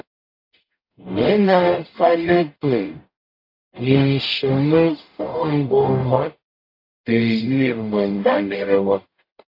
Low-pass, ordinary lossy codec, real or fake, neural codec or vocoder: 5.4 kHz; AAC, 32 kbps; fake; codec, 44.1 kHz, 0.9 kbps, DAC